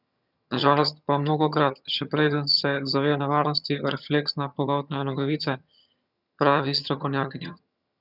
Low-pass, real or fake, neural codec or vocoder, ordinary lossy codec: 5.4 kHz; fake; vocoder, 22.05 kHz, 80 mel bands, HiFi-GAN; none